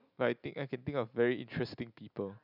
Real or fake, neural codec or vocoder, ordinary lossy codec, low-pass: real; none; none; 5.4 kHz